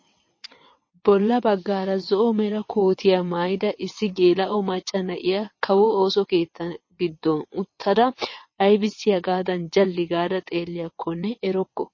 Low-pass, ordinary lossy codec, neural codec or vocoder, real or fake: 7.2 kHz; MP3, 32 kbps; vocoder, 22.05 kHz, 80 mel bands, WaveNeXt; fake